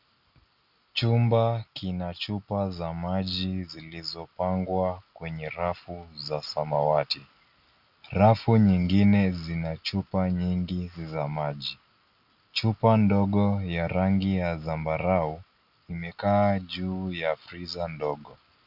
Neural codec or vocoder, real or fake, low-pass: none; real; 5.4 kHz